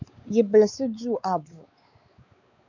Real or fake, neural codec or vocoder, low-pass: fake; codec, 16 kHz, 4 kbps, X-Codec, WavLM features, trained on Multilingual LibriSpeech; 7.2 kHz